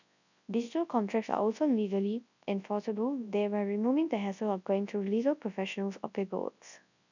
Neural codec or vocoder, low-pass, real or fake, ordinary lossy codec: codec, 24 kHz, 0.9 kbps, WavTokenizer, large speech release; 7.2 kHz; fake; none